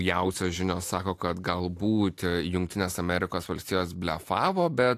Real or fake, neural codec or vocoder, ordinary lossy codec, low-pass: real; none; AAC, 64 kbps; 14.4 kHz